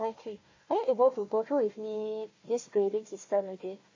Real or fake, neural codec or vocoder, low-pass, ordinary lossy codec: fake; codec, 16 kHz, 1 kbps, FunCodec, trained on Chinese and English, 50 frames a second; 7.2 kHz; MP3, 32 kbps